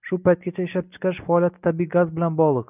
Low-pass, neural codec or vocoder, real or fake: 3.6 kHz; none; real